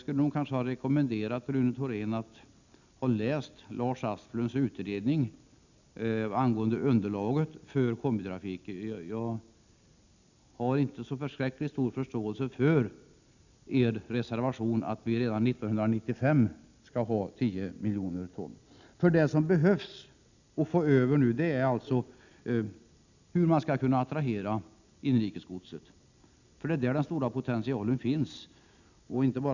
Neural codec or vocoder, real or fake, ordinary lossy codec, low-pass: none; real; none; 7.2 kHz